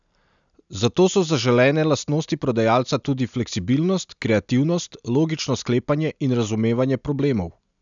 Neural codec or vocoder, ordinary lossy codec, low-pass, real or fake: none; none; 7.2 kHz; real